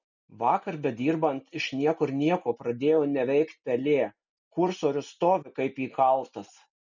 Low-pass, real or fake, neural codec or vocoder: 7.2 kHz; real; none